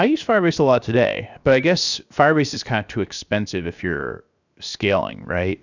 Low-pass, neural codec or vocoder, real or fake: 7.2 kHz; codec, 16 kHz, 0.7 kbps, FocalCodec; fake